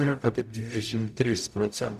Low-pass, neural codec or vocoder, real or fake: 14.4 kHz; codec, 44.1 kHz, 0.9 kbps, DAC; fake